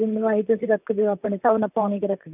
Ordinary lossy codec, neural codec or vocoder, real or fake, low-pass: none; vocoder, 44.1 kHz, 128 mel bands, Pupu-Vocoder; fake; 3.6 kHz